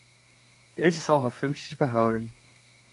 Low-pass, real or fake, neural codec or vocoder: 10.8 kHz; fake; codec, 24 kHz, 1 kbps, SNAC